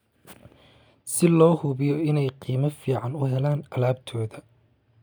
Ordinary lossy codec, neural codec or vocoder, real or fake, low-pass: none; none; real; none